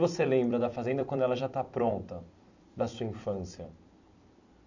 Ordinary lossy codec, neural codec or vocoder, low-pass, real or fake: none; vocoder, 44.1 kHz, 128 mel bands every 256 samples, BigVGAN v2; 7.2 kHz; fake